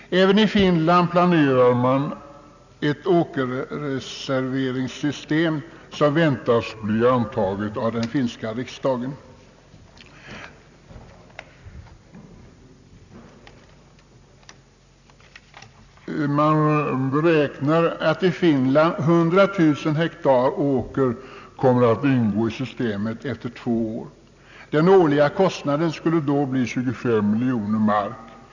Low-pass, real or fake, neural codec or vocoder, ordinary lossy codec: 7.2 kHz; real; none; none